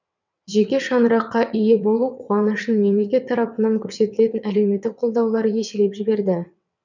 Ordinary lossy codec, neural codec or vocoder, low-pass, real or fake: none; vocoder, 22.05 kHz, 80 mel bands, WaveNeXt; 7.2 kHz; fake